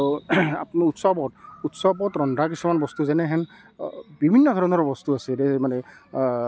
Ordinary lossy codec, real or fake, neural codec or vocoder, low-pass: none; real; none; none